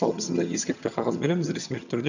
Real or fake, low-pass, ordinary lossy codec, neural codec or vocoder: fake; 7.2 kHz; none; vocoder, 22.05 kHz, 80 mel bands, HiFi-GAN